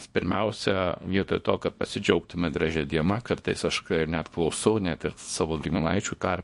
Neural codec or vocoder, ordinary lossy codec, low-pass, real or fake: codec, 24 kHz, 0.9 kbps, WavTokenizer, small release; MP3, 48 kbps; 10.8 kHz; fake